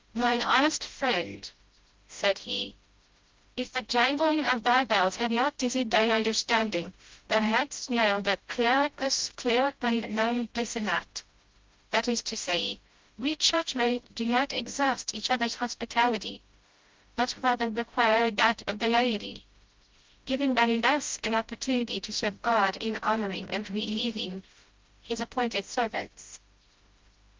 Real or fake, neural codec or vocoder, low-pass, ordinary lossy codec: fake; codec, 16 kHz, 0.5 kbps, FreqCodec, smaller model; 7.2 kHz; Opus, 32 kbps